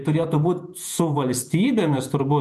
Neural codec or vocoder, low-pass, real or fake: vocoder, 48 kHz, 128 mel bands, Vocos; 14.4 kHz; fake